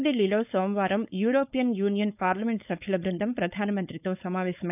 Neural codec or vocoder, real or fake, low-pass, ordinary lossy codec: codec, 16 kHz, 4.8 kbps, FACodec; fake; 3.6 kHz; none